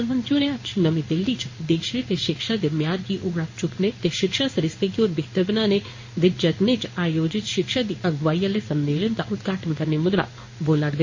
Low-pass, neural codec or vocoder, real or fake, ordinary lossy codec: 7.2 kHz; codec, 16 kHz in and 24 kHz out, 1 kbps, XY-Tokenizer; fake; MP3, 32 kbps